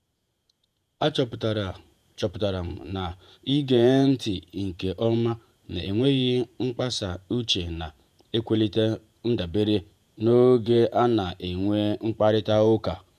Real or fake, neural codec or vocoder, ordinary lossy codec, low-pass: fake; vocoder, 48 kHz, 128 mel bands, Vocos; none; 14.4 kHz